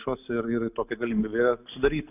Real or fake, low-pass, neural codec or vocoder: real; 3.6 kHz; none